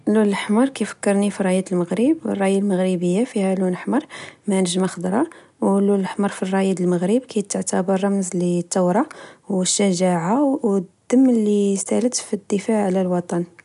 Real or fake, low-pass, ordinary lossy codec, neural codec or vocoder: real; 10.8 kHz; none; none